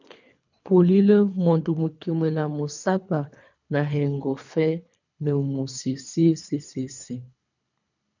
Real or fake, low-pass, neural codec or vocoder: fake; 7.2 kHz; codec, 24 kHz, 3 kbps, HILCodec